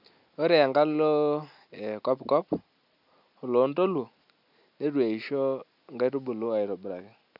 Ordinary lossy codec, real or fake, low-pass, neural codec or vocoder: none; real; 5.4 kHz; none